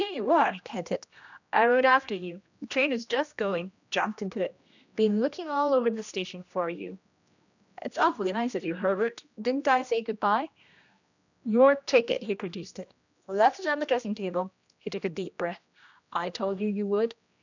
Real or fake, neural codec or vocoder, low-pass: fake; codec, 16 kHz, 1 kbps, X-Codec, HuBERT features, trained on general audio; 7.2 kHz